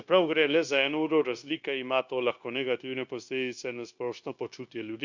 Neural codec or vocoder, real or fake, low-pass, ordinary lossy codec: codec, 16 kHz, 0.9 kbps, LongCat-Audio-Codec; fake; 7.2 kHz; Opus, 64 kbps